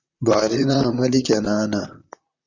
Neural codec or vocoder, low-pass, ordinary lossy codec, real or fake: vocoder, 44.1 kHz, 128 mel bands, Pupu-Vocoder; 7.2 kHz; Opus, 64 kbps; fake